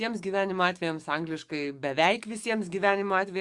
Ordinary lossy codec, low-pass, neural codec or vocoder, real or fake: Opus, 64 kbps; 10.8 kHz; codec, 44.1 kHz, 7.8 kbps, DAC; fake